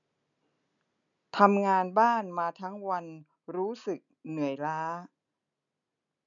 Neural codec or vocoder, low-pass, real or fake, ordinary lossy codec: none; 7.2 kHz; real; none